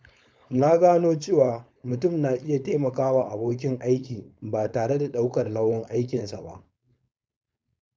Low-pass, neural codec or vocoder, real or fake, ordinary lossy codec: none; codec, 16 kHz, 4.8 kbps, FACodec; fake; none